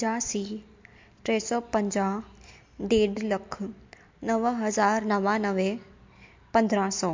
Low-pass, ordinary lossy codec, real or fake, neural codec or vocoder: 7.2 kHz; MP3, 48 kbps; real; none